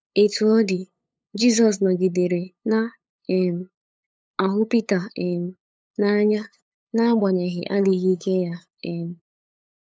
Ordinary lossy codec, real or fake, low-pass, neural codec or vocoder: none; fake; none; codec, 16 kHz, 8 kbps, FunCodec, trained on LibriTTS, 25 frames a second